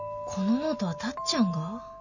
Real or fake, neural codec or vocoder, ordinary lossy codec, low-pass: real; none; none; 7.2 kHz